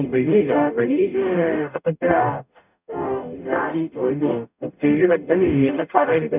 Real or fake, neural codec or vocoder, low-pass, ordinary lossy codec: fake; codec, 44.1 kHz, 0.9 kbps, DAC; 3.6 kHz; none